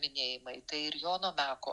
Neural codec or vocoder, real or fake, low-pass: none; real; 10.8 kHz